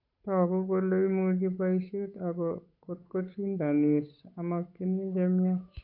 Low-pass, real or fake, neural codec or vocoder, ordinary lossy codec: 5.4 kHz; fake; codec, 16 kHz, 8 kbps, FunCodec, trained on Chinese and English, 25 frames a second; none